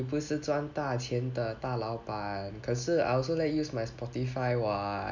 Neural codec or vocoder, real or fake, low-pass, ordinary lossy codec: none; real; 7.2 kHz; none